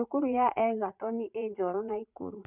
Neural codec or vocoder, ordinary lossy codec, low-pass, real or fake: vocoder, 44.1 kHz, 80 mel bands, Vocos; none; 3.6 kHz; fake